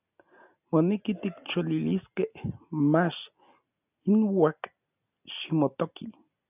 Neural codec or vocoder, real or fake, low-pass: none; real; 3.6 kHz